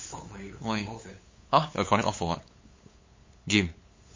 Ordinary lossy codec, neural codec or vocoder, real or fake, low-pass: MP3, 32 kbps; codec, 16 kHz, 8 kbps, FunCodec, trained on LibriTTS, 25 frames a second; fake; 7.2 kHz